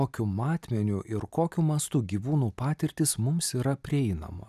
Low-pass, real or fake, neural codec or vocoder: 14.4 kHz; real; none